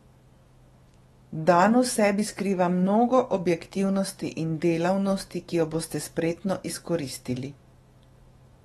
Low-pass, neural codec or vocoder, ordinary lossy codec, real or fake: 19.8 kHz; autoencoder, 48 kHz, 128 numbers a frame, DAC-VAE, trained on Japanese speech; AAC, 32 kbps; fake